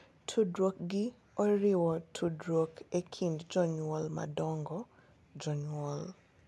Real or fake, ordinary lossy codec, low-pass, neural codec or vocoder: real; none; none; none